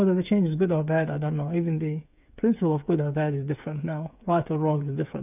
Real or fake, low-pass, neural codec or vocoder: fake; 3.6 kHz; codec, 16 kHz, 8 kbps, FreqCodec, smaller model